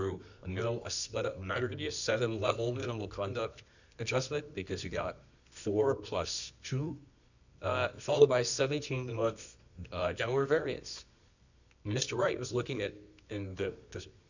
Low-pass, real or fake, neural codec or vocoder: 7.2 kHz; fake; codec, 24 kHz, 0.9 kbps, WavTokenizer, medium music audio release